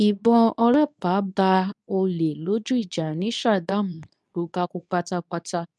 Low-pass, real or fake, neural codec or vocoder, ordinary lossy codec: none; fake; codec, 24 kHz, 0.9 kbps, WavTokenizer, medium speech release version 2; none